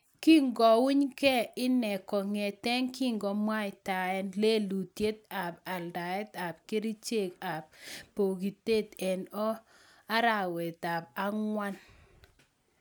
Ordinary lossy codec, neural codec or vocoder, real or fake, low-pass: none; none; real; none